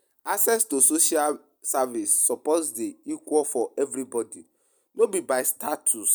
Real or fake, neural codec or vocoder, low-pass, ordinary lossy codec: real; none; none; none